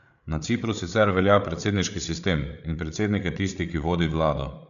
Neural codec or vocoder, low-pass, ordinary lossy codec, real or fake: codec, 16 kHz, 8 kbps, FreqCodec, larger model; 7.2 kHz; none; fake